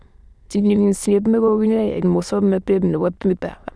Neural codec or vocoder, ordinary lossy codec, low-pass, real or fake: autoencoder, 22.05 kHz, a latent of 192 numbers a frame, VITS, trained on many speakers; none; none; fake